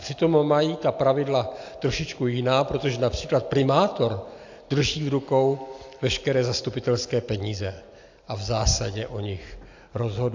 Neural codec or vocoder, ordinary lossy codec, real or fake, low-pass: none; AAC, 48 kbps; real; 7.2 kHz